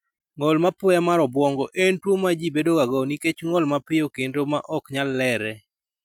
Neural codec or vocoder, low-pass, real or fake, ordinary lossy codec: none; 19.8 kHz; real; none